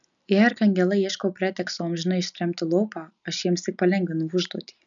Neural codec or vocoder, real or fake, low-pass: none; real; 7.2 kHz